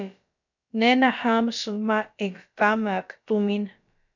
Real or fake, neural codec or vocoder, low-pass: fake; codec, 16 kHz, about 1 kbps, DyCAST, with the encoder's durations; 7.2 kHz